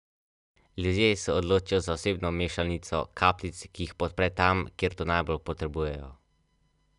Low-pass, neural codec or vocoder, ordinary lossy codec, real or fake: 10.8 kHz; none; none; real